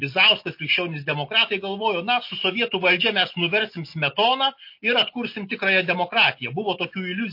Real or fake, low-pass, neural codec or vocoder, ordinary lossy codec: real; 5.4 kHz; none; MP3, 32 kbps